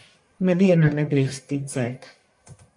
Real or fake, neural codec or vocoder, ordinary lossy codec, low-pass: fake; codec, 44.1 kHz, 1.7 kbps, Pupu-Codec; MP3, 64 kbps; 10.8 kHz